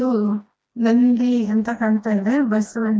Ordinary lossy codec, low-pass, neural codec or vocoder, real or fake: none; none; codec, 16 kHz, 1 kbps, FreqCodec, smaller model; fake